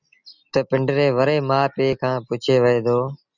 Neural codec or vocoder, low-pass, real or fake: none; 7.2 kHz; real